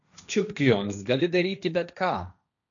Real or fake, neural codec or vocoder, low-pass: fake; codec, 16 kHz, 1.1 kbps, Voila-Tokenizer; 7.2 kHz